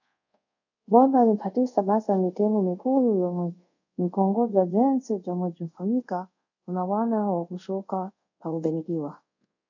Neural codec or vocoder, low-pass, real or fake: codec, 24 kHz, 0.5 kbps, DualCodec; 7.2 kHz; fake